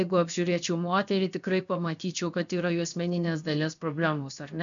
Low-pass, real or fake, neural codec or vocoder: 7.2 kHz; fake; codec, 16 kHz, 0.7 kbps, FocalCodec